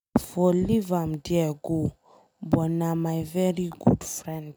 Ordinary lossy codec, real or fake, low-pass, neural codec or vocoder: none; real; none; none